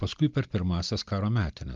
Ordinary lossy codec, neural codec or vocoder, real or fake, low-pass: Opus, 32 kbps; none; real; 7.2 kHz